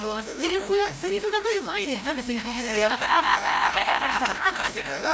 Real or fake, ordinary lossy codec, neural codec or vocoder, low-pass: fake; none; codec, 16 kHz, 0.5 kbps, FreqCodec, larger model; none